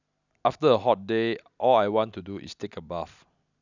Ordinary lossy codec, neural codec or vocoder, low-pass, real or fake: none; none; 7.2 kHz; real